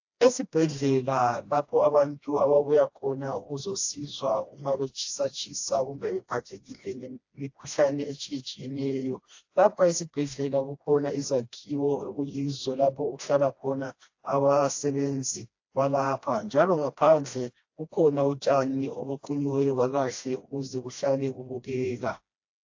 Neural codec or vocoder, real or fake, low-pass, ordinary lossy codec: codec, 16 kHz, 1 kbps, FreqCodec, smaller model; fake; 7.2 kHz; AAC, 48 kbps